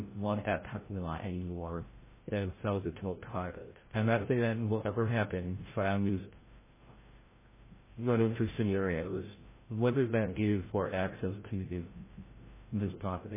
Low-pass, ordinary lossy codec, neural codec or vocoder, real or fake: 3.6 kHz; MP3, 16 kbps; codec, 16 kHz, 0.5 kbps, FreqCodec, larger model; fake